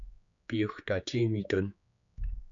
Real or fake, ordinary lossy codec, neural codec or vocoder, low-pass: fake; MP3, 96 kbps; codec, 16 kHz, 4 kbps, X-Codec, HuBERT features, trained on general audio; 7.2 kHz